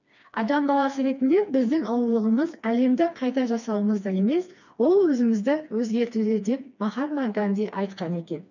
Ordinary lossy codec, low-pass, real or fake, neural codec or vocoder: none; 7.2 kHz; fake; codec, 16 kHz, 2 kbps, FreqCodec, smaller model